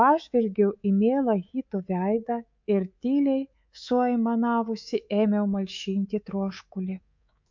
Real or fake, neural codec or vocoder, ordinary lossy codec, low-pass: fake; codec, 24 kHz, 3.1 kbps, DualCodec; MP3, 48 kbps; 7.2 kHz